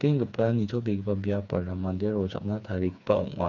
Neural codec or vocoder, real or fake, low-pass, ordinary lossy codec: codec, 16 kHz, 4 kbps, FreqCodec, smaller model; fake; 7.2 kHz; none